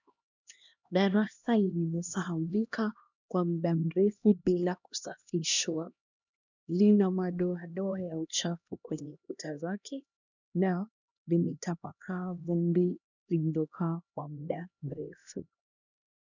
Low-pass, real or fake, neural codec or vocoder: 7.2 kHz; fake; codec, 16 kHz, 1 kbps, X-Codec, HuBERT features, trained on LibriSpeech